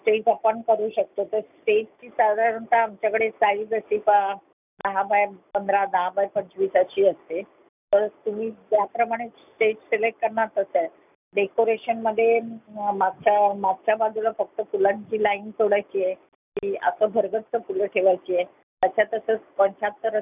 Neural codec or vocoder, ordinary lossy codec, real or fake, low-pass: none; none; real; 3.6 kHz